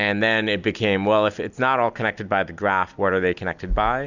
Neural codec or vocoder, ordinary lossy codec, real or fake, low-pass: none; Opus, 64 kbps; real; 7.2 kHz